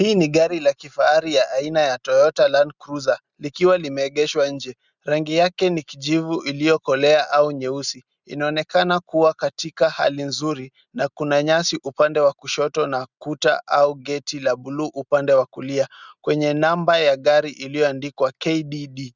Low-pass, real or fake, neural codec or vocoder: 7.2 kHz; real; none